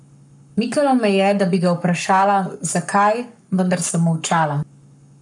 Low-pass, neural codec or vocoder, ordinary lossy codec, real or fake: 10.8 kHz; codec, 44.1 kHz, 7.8 kbps, Pupu-Codec; none; fake